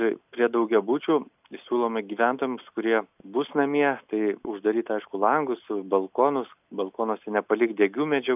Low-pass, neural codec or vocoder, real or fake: 3.6 kHz; none; real